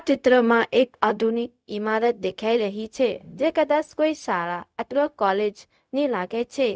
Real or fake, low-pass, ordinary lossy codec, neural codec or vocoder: fake; none; none; codec, 16 kHz, 0.4 kbps, LongCat-Audio-Codec